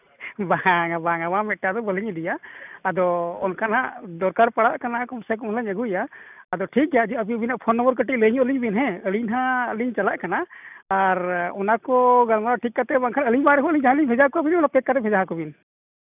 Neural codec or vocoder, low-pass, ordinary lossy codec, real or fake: none; 3.6 kHz; none; real